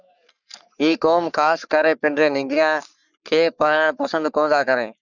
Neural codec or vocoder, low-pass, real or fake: codec, 44.1 kHz, 3.4 kbps, Pupu-Codec; 7.2 kHz; fake